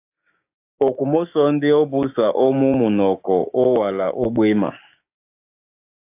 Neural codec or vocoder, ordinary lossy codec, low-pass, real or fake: codec, 16 kHz, 6 kbps, DAC; AAC, 32 kbps; 3.6 kHz; fake